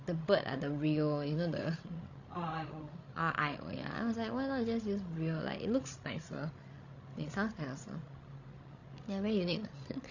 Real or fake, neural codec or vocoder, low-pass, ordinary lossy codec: fake; codec, 16 kHz, 16 kbps, FreqCodec, larger model; 7.2 kHz; none